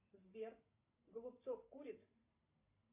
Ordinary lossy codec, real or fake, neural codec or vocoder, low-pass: MP3, 24 kbps; fake; vocoder, 44.1 kHz, 128 mel bands every 512 samples, BigVGAN v2; 3.6 kHz